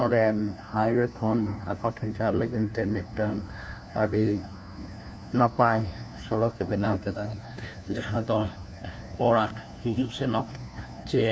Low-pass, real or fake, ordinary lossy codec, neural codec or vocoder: none; fake; none; codec, 16 kHz, 1 kbps, FunCodec, trained on LibriTTS, 50 frames a second